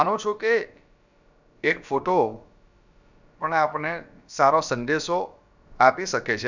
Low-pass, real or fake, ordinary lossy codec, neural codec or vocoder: 7.2 kHz; fake; none; codec, 16 kHz, about 1 kbps, DyCAST, with the encoder's durations